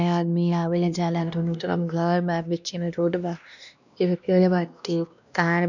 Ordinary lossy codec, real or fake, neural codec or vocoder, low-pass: none; fake; codec, 16 kHz, 1 kbps, X-Codec, WavLM features, trained on Multilingual LibriSpeech; 7.2 kHz